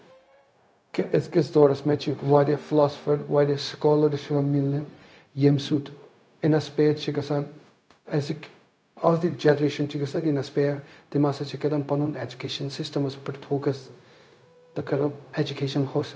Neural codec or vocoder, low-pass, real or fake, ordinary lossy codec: codec, 16 kHz, 0.4 kbps, LongCat-Audio-Codec; none; fake; none